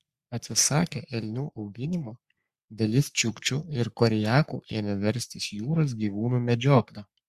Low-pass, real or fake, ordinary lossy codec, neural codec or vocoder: 14.4 kHz; fake; Opus, 64 kbps; codec, 44.1 kHz, 3.4 kbps, Pupu-Codec